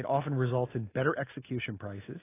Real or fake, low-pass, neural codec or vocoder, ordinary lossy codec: real; 3.6 kHz; none; AAC, 16 kbps